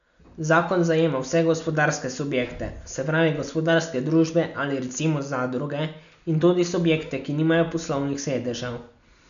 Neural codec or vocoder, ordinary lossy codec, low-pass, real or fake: none; none; 7.2 kHz; real